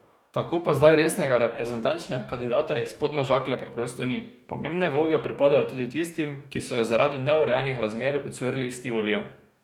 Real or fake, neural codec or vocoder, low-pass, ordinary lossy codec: fake; codec, 44.1 kHz, 2.6 kbps, DAC; 19.8 kHz; none